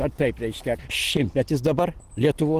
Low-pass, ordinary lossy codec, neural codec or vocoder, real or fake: 14.4 kHz; Opus, 16 kbps; none; real